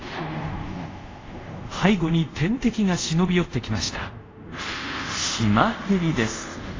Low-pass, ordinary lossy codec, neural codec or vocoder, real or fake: 7.2 kHz; AAC, 32 kbps; codec, 24 kHz, 0.5 kbps, DualCodec; fake